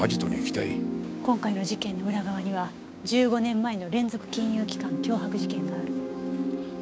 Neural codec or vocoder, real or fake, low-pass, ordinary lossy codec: codec, 16 kHz, 6 kbps, DAC; fake; none; none